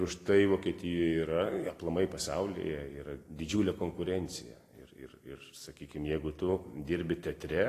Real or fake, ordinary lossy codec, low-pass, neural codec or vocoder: real; AAC, 48 kbps; 14.4 kHz; none